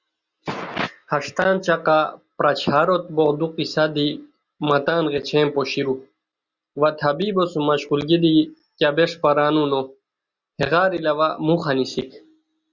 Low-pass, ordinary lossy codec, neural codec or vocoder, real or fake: 7.2 kHz; Opus, 64 kbps; none; real